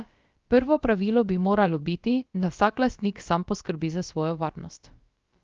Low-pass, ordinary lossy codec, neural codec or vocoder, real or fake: 7.2 kHz; Opus, 32 kbps; codec, 16 kHz, about 1 kbps, DyCAST, with the encoder's durations; fake